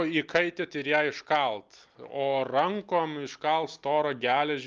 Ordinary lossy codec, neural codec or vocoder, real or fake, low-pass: Opus, 32 kbps; none; real; 7.2 kHz